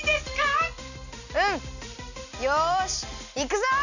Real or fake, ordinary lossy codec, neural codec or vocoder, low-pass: real; none; none; 7.2 kHz